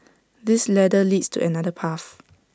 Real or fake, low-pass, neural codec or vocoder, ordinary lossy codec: real; none; none; none